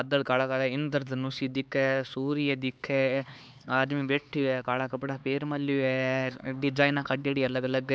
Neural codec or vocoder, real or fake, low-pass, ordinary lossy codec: codec, 16 kHz, 4 kbps, X-Codec, HuBERT features, trained on LibriSpeech; fake; none; none